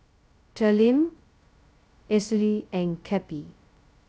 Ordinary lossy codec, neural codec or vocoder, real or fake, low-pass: none; codec, 16 kHz, 0.2 kbps, FocalCodec; fake; none